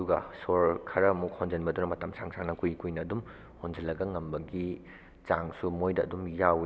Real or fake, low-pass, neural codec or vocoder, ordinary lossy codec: real; none; none; none